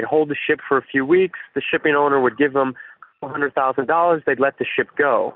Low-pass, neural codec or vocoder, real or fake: 5.4 kHz; none; real